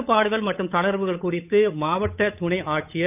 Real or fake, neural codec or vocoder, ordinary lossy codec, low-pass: fake; codec, 16 kHz, 8 kbps, FunCodec, trained on Chinese and English, 25 frames a second; none; 3.6 kHz